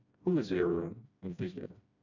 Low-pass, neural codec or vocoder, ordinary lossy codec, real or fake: 7.2 kHz; codec, 16 kHz, 1 kbps, FreqCodec, smaller model; none; fake